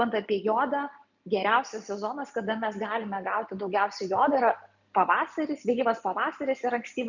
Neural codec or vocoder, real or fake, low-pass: none; real; 7.2 kHz